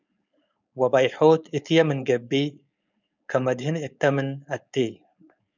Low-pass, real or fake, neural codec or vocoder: 7.2 kHz; fake; codec, 16 kHz, 4.8 kbps, FACodec